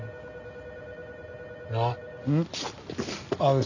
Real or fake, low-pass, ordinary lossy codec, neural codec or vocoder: real; 7.2 kHz; none; none